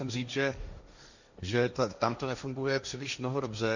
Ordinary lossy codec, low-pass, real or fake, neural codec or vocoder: Opus, 64 kbps; 7.2 kHz; fake; codec, 16 kHz, 1.1 kbps, Voila-Tokenizer